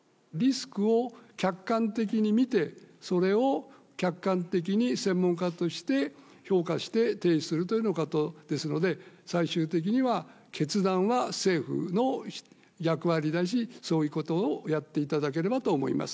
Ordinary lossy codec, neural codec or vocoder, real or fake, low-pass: none; none; real; none